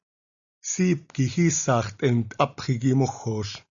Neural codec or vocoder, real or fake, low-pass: none; real; 7.2 kHz